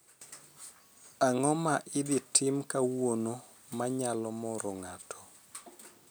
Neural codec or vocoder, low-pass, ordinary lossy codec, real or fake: none; none; none; real